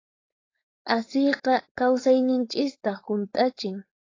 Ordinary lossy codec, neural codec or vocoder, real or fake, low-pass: AAC, 32 kbps; codec, 16 kHz, 4.8 kbps, FACodec; fake; 7.2 kHz